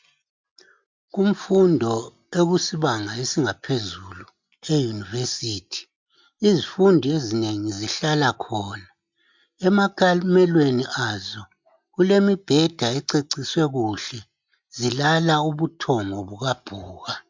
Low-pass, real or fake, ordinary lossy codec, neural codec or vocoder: 7.2 kHz; real; MP3, 64 kbps; none